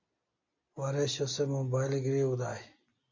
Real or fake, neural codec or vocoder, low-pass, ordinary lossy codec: real; none; 7.2 kHz; MP3, 64 kbps